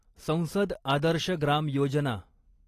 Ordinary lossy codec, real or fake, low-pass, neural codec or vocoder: AAC, 48 kbps; real; 14.4 kHz; none